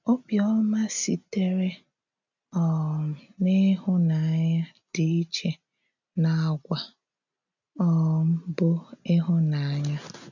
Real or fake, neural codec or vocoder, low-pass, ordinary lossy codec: real; none; 7.2 kHz; none